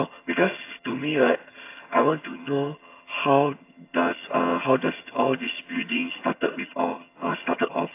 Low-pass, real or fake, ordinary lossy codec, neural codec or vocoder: 3.6 kHz; fake; AAC, 24 kbps; vocoder, 22.05 kHz, 80 mel bands, HiFi-GAN